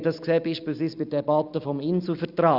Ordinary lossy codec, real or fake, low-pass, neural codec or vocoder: Opus, 64 kbps; real; 5.4 kHz; none